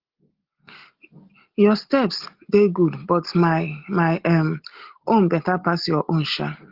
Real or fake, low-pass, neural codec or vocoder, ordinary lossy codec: real; 5.4 kHz; none; Opus, 16 kbps